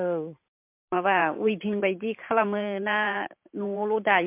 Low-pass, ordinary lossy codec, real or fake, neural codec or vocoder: 3.6 kHz; MP3, 32 kbps; fake; vocoder, 44.1 kHz, 128 mel bands every 512 samples, BigVGAN v2